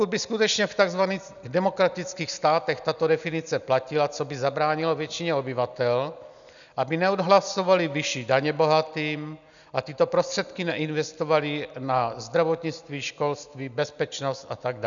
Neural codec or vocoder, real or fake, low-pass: none; real; 7.2 kHz